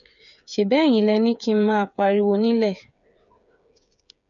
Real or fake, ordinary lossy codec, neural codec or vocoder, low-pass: fake; none; codec, 16 kHz, 8 kbps, FreqCodec, smaller model; 7.2 kHz